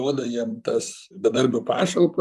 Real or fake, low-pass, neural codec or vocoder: fake; 14.4 kHz; vocoder, 44.1 kHz, 128 mel bands, Pupu-Vocoder